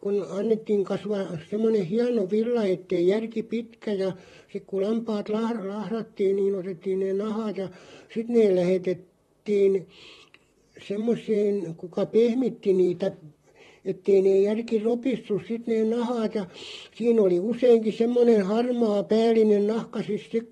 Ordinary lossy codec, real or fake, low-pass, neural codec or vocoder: AAC, 32 kbps; fake; 19.8 kHz; vocoder, 44.1 kHz, 128 mel bands, Pupu-Vocoder